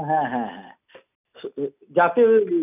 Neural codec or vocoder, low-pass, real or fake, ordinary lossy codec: none; 3.6 kHz; real; none